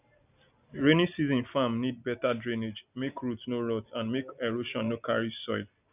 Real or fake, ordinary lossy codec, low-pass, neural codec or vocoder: real; none; 3.6 kHz; none